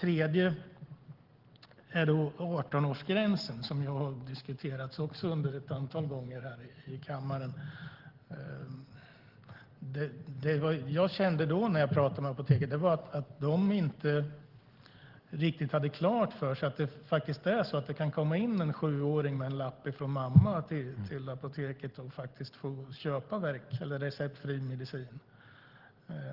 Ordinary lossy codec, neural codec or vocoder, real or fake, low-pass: Opus, 16 kbps; none; real; 5.4 kHz